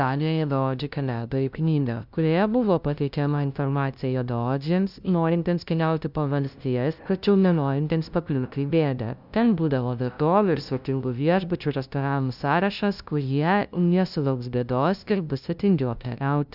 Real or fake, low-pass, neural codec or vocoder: fake; 5.4 kHz; codec, 16 kHz, 0.5 kbps, FunCodec, trained on LibriTTS, 25 frames a second